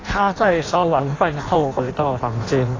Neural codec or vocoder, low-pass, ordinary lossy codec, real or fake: codec, 16 kHz in and 24 kHz out, 0.6 kbps, FireRedTTS-2 codec; 7.2 kHz; AAC, 32 kbps; fake